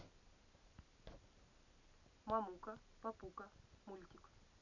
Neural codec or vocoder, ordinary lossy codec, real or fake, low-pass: none; none; real; 7.2 kHz